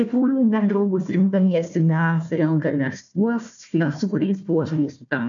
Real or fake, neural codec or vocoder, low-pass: fake; codec, 16 kHz, 1 kbps, FunCodec, trained on Chinese and English, 50 frames a second; 7.2 kHz